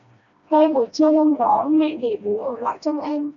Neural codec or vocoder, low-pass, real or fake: codec, 16 kHz, 1 kbps, FreqCodec, smaller model; 7.2 kHz; fake